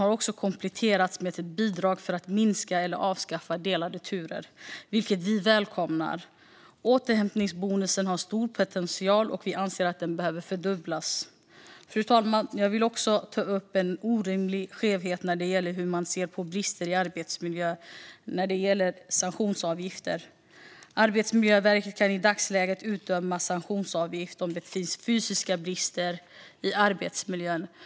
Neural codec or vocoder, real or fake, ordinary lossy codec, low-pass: none; real; none; none